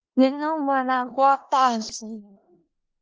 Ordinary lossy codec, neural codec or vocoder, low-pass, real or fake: Opus, 24 kbps; codec, 16 kHz in and 24 kHz out, 0.4 kbps, LongCat-Audio-Codec, four codebook decoder; 7.2 kHz; fake